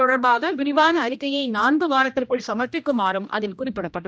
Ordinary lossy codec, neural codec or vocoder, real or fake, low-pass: none; codec, 16 kHz, 1 kbps, X-Codec, HuBERT features, trained on general audio; fake; none